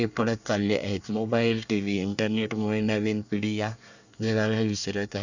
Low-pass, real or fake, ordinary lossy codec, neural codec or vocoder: 7.2 kHz; fake; none; codec, 24 kHz, 1 kbps, SNAC